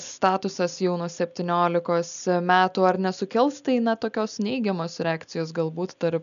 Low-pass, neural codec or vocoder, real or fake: 7.2 kHz; none; real